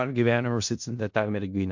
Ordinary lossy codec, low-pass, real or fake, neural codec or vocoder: MP3, 48 kbps; 7.2 kHz; fake; codec, 16 kHz in and 24 kHz out, 0.4 kbps, LongCat-Audio-Codec, four codebook decoder